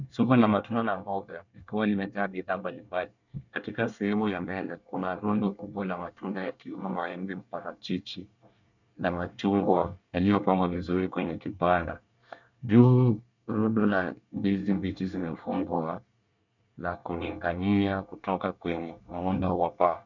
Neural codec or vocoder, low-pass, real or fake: codec, 24 kHz, 1 kbps, SNAC; 7.2 kHz; fake